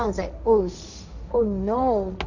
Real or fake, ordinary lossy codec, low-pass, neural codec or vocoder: fake; none; 7.2 kHz; codec, 16 kHz, 1.1 kbps, Voila-Tokenizer